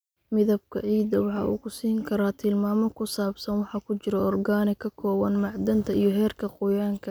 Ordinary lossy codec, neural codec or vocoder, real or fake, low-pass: none; none; real; none